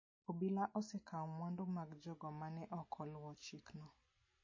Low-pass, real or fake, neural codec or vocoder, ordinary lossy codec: 7.2 kHz; real; none; MP3, 32 kbps